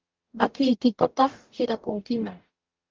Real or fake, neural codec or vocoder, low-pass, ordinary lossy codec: fake; codec, 44.1 kHz, 0.9 kbps, DAC; 7.2 kHz; Opus, 32 kbps